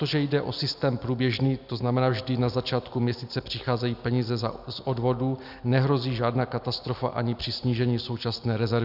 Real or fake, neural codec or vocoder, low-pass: real; none; 5.4 kHz